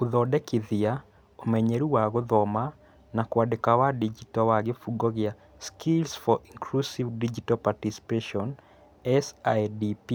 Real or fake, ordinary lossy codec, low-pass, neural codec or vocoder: real; none; none; none